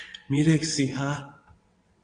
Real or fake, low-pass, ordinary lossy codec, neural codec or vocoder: fake; 9.9 kHz; AAC, 48 kbps; vocoder, 22.05 kHz, 80 mel bands, WaveNeXt